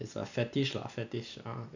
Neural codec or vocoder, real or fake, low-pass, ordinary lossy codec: none; real; 7.2 kHz; none